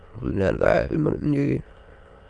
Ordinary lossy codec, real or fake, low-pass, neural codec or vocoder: Opus, 32 kbps; fake; 9.9 kHz; autoencoder, 22.05 kHz, a latent of 192 numbers a frame, VITS, trained on many speakers